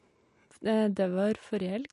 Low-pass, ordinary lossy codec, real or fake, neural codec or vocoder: 14.4 kHz; MP3, 48 kbps; real; none